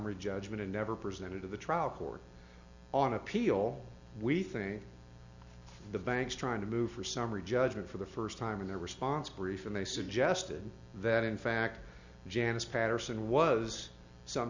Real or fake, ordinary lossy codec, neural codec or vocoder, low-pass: real; Opus, 64 kbps; none; 7.2 kHz